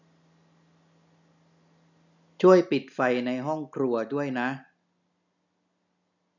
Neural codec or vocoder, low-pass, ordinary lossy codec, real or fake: none; 7.2 kHz; none; real